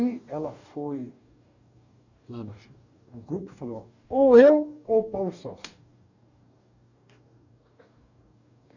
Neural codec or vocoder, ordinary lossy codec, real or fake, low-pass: codec, 44.1 kHz, 2.6 kbps, DAC; none; fake; 7.2 kHz